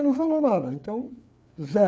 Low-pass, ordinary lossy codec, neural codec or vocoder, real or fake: none; none; codec, 16 kHz, 4 kbps, FreqCodec, larger model; fake